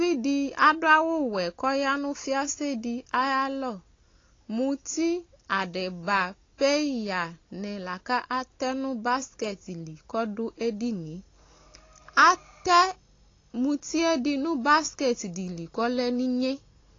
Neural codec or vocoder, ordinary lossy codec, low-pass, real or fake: none; AAC, 32 kbps; 7.2 kHz; real